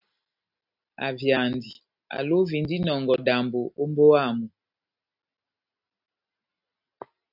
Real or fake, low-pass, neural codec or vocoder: real; 5.4 kHz; none